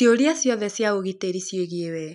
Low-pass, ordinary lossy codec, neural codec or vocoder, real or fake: 10.8 kHz; none; none; real